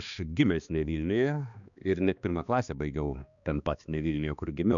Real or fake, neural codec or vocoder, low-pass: fake; codec, 16 kHz, 2 kbps, X-Codec, HuBERT features, trained on balanced general audio; 7.2 kHz